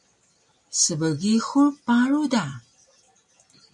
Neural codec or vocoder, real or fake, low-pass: none; real; 10.8 kHz